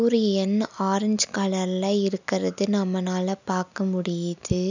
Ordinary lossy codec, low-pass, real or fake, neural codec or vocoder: none; 7.2 kHz; real; none